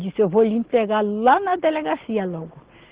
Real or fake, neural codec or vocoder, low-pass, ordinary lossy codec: real; none; 3.6 kHz; Opus, 16 kbps